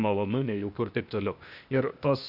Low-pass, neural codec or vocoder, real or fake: 5.4 kHz; codec, 16 kHz, 0.8 kbps, ZipCodec; fake